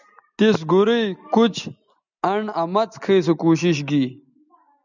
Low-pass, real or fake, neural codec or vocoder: 7.2 kHz; real; none